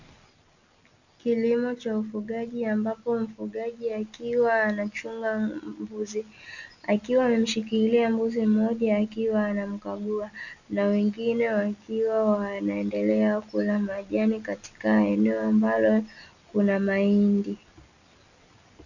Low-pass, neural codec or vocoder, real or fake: 7.2 kHz; none; real